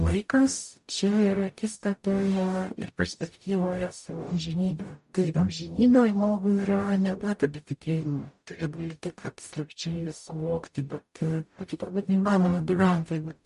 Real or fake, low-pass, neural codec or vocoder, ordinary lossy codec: fake; 14.4 kHz; codec, 44.1 kHz, 0.9 kbps, DAC; MP3, 48 kbps